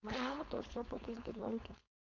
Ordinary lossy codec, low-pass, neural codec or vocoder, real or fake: none; 7.2 kHz; codec, 16 kHz, 4.8 kbps, FACodec; fake